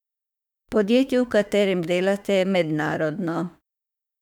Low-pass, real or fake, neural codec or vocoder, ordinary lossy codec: 19.8 kHz; fake; autoencoder, 48 kHz, 32 numbers a frame, DAC-VAE, trained on Japanese speech; none